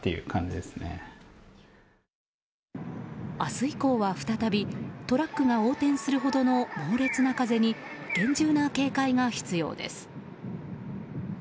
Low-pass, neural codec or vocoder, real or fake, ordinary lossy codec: none; none; real; none